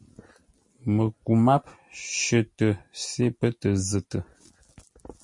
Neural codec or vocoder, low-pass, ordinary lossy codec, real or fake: none; 10.8 kHz; MP3, 48 kbps; real